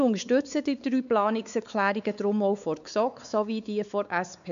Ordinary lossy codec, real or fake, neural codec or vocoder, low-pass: none; fake; codec, 16 kHz, 4 kbps, X-Codec, WavLM features, trained on Multilingual LibriSpeech; 7.2 kHz